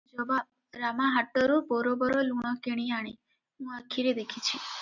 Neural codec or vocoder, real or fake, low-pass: none; real; 7.2 kHz